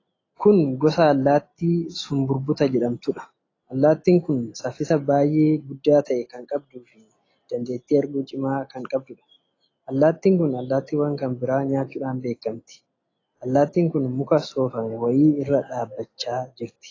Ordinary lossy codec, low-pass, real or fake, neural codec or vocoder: AAC, 32 kbps; 7.2 kHz; real; none